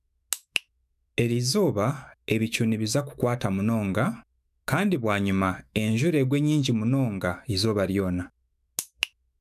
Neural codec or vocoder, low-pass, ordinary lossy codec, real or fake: autoencoder, 48 kHz, 128 numbers a frame, DAC-VAE, trained on Japanese speech; 14.4 kHz; none; fake